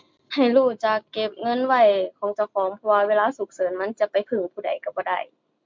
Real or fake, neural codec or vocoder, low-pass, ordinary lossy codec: real; none; 7.2 kHz; MP3, 64 kbps